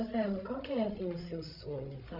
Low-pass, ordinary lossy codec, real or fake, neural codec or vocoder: 5.4 kHz; none; fake; codec, 16 kHz, 16 kbps, FreqCodec, larger model